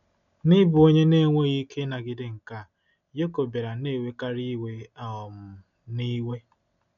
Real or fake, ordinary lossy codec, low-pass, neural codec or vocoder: real; none; 7.2 kHz; none